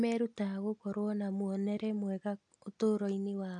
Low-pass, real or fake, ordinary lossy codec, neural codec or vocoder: 9.9 kHz; real; AAC, 64 kbps; none